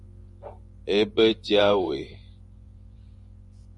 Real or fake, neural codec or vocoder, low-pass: fake; vocoder, 24 kHz, 100 mel bands, Vocos; 10.8 kHz